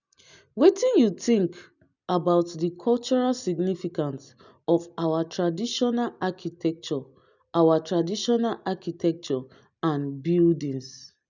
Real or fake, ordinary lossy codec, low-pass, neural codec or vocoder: real; none; 7.2 kHz; none